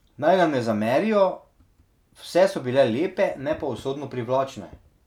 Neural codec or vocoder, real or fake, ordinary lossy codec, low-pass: none; real; none; 19.8 kHz